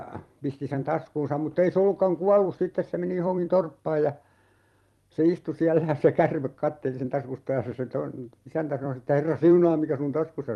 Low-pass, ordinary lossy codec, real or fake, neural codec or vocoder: 19.8 kHz; Opus, 32 kbps; fake; vocoder, 44.1 kHz, 128 mel bands every 256 samples, BigVGAN v2